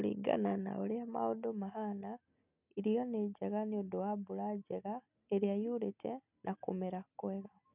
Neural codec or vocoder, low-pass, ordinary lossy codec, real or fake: none; 3.6 kHz; none; real